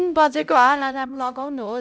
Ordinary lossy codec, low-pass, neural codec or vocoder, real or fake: none; none; codec, 16 kHz, 0.5 kbps, X-Codec, HuBERT features, trained on LibriSpeech; fake